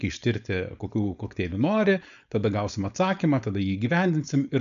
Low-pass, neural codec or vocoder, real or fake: 7.2 kHz; codec, 16 kHz, 4.8 kbps, FACodec; fake